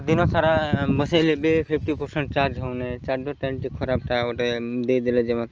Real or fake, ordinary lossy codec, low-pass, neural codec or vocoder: real; Opus, 24 kbps; 7.2 kHz; none